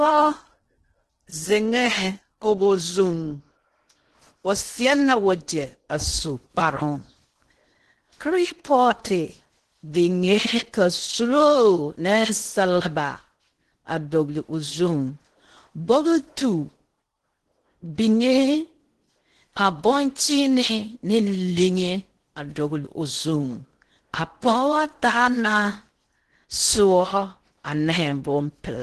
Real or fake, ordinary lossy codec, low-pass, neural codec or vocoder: fake; Opus, 16 kbps; 10.8 kHz; codec, 16 kHz in and 24 kHz out, 0.6 kbps, FocalCodec, streaming, 2048 codes